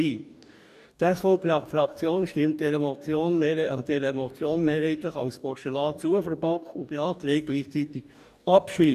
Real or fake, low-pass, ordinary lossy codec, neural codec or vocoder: fake; 14.4 kHz; none; codec, 44.1 kHz, 2.6 kbps, DAC